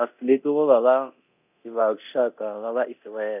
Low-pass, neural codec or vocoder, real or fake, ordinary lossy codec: 3.6 kHz; codec, 24 kHz, 0.9 kbps, DualCodec; fake; none